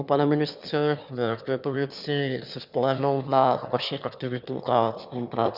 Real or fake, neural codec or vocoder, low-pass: fake; autoencoder, 22.05 kHz, a latent of 192 numbers a frame, VITS, trained on one speaker; 5.4 kHz